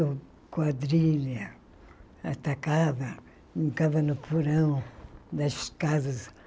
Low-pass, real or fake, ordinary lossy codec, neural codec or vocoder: none; real; none; none